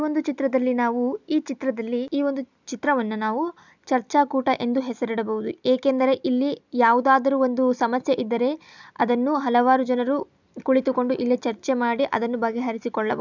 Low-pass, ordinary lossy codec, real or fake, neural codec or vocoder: 7.2 kHz; none; real; none